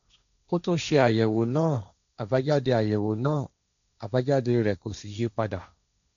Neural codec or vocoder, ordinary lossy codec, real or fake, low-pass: codec, 16 kHz, 1.1 kbps, Voila-Tokenizer; none; fake; 7.2 kHz